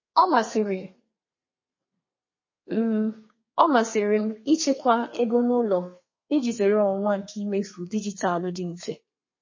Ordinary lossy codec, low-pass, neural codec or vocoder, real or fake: MP3, 32 kbps; 7.2 kHz; codec, 32 kHz, 1.9 kbps, SNAC; fake